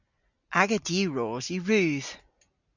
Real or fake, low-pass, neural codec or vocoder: real; 7.2 kHz; none